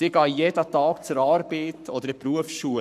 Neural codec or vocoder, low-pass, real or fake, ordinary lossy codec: codec, 44.1 kHz, 7.8 kbps, Pupu-Codec; 14.4 kHz; fake; none